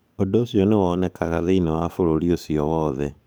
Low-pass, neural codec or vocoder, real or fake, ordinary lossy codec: none; codec, 44.1 kHz, 7.8 kbps, DAC; fake; none